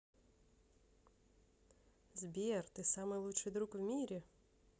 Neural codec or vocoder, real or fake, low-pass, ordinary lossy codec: none; real; none; none